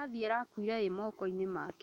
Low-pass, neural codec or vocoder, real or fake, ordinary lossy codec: 19.8 kHz; autoencoder, 48 kHz, 128 numbers a frame, DAC-VAE, trained on Japanese speech; fake; MP3, 64 kbps